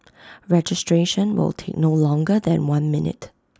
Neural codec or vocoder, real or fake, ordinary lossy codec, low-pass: none; real; none; none